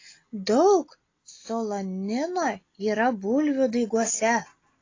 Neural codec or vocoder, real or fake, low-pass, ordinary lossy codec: none; real; 7.2 kHz; AAC, 32 kbps